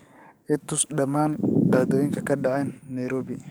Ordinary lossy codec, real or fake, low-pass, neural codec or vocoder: none; fake; none; codec, 44.1 kHz, 7.8 kbps, DAC